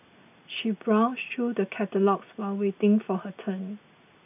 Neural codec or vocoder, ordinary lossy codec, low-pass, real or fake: none; none; 3.6 kHz; real